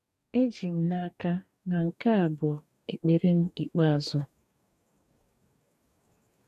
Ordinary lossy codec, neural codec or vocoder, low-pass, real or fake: none; codec, 44.1 kHz, 2.6 kbps, DAC; 14.4 kHz; fake